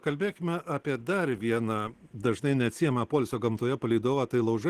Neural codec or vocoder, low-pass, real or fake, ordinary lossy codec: none; 14.4 kHz; real; Opus, 16 kbps